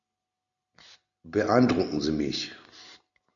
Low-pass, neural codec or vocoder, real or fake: 7.2 kHz; none; real